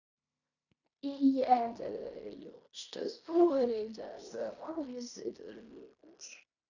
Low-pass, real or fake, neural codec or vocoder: 7.2 kHz; fake; codec, 16 kHz in and 24 kHz out, 0.9 kbps, LongCat-Audio-Codec, fine tuned four codebook decoder